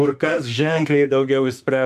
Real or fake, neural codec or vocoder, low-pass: fake; autoencoder, 48 kHz, 32 numbers a frame, DAC-VAE, trained on Japanese speech; 14.4 kHz